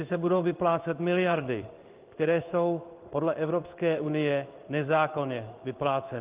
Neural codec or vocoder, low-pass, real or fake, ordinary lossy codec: codec, 16 kHz in and 24 kHz out, 1 kbps, XY-Tokenizer; 3.6 kHz; fake; Opus, 32 kbps